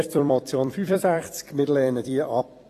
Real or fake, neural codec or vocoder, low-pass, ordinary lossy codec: fake; vocoder, 44.1 kHz, 128 mel bands every 256 samples, BigVGAN v2; 14.4 kHz; AAC, 48 kbps